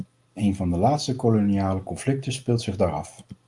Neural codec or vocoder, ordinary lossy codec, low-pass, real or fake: none; Opus, 32 kbps; 10.8 kHz; real